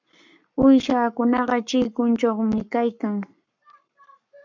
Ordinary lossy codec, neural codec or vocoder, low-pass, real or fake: MP3, 64 kbps; codec, 44.1 kHz, 7.8 kbps, Pupu-Codec; 7.2 kHz; fake